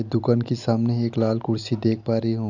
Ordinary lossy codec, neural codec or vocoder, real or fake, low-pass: none; none; real; 7.2 kHz